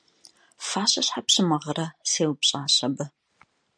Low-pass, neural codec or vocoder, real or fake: 9.9 kHz; none; real